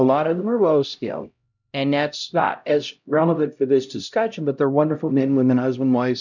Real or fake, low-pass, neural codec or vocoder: fake; 7.2 kHz; codec, 16 kHz, 0.5 kbps, X-Codec, HuBERT features, trained on LibriSpeech